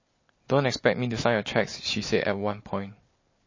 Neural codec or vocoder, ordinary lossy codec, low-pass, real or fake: none; MP3, 32 kbps; 7.2 kHz; real